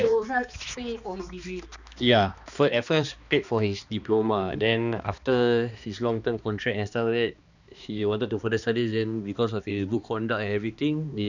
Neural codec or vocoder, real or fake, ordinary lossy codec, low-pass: codec, 16 kHz, 2 kbps, X-Codec, HuBERT features, trained on balanced general audio; fake; none; 7.2 kHz